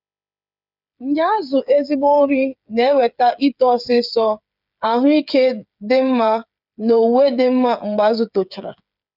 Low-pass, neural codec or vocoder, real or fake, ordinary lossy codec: 5.4 kHz; codec, 16 kHz, 8 kbps, FreqCodec, smaller model; fake; none